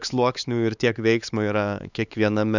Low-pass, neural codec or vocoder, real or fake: 7.2 kHz; codec, 16 kHz, 4 kbps, X-Codec, HuBERT features, trained on LibriSpeech; fake